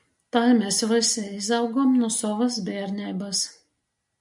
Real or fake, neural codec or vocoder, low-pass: real; none; 10.8 kHz